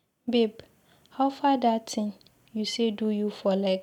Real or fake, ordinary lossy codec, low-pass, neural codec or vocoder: real; none; 19.8 kHz; none